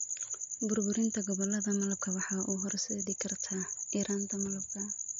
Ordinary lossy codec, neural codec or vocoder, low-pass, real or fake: MP3, 48 kbps; none; 7.2 kHz; real